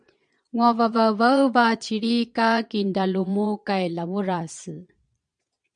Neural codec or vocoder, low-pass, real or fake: vocoder, 22.05 kHz, 80 mel bands, Vocos; 9.9 kHz; fake